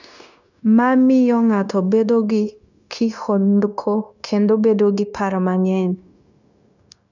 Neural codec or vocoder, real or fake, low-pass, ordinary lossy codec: codec, 16 kHz, 0.9 kbps, LongCat-Audio-Codec; fake; 7.2 kHz; none